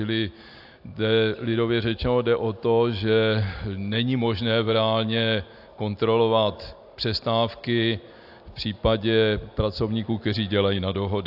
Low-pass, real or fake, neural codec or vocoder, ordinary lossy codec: 5.4 kHz; real; none; AAC, 48 kbps